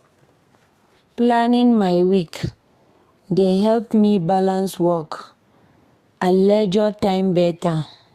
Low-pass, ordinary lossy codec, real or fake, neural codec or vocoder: 14.4 kHz; Opus, 64 kbps; fake; codec, 32 kHz, 1.9 kbps, SNAC